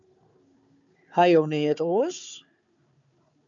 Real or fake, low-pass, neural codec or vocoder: fake; 7.2 kHz; codec, 16 kHz, 4 kbps, FunCodec, trained on Chinese and English, 50 frames a second